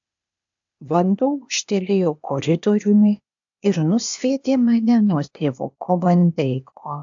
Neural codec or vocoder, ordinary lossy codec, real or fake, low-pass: codec, 16 kHz, 0.8 kbps, ZipCodec; AAC, 64 kbps; fake; 7.2 kHz